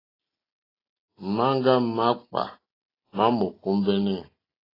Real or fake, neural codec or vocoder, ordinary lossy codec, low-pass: real; none; AAC, 24 kbps; 5.4 kHz